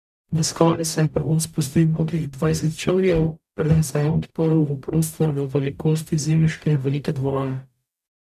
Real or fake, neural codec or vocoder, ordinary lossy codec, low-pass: fake; codec, 44.1 kHz, 0.9 kbps, DAC; none; 14.4 kHz